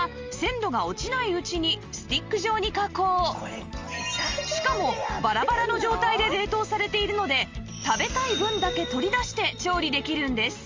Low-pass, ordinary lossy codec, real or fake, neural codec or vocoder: 7.2 kHz; Opus, 32 kbps; real; none